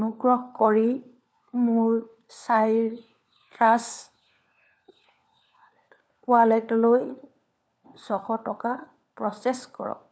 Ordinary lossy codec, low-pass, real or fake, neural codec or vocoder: none; none; fake; codec, 16 kHz, 4 kbps, FunCodec, trained on LibriTTS, 50 frames a second